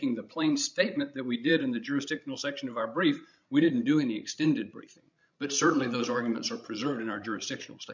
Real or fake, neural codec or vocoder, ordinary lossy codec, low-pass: fake; codec, 16 kHz, 16 kbps, FreqCodec, larger model; MP3, 64 kbps; 7.2 kHz